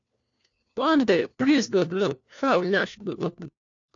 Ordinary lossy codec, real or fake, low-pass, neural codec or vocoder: AAC, 48 kbps; fake; 7.2 kHz; codec, 16 kHz, 1 kbps, FunCodec, trained on LibriTTS, 50 frames a second